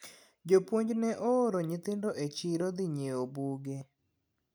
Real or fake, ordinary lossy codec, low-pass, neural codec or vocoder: real; none; none; none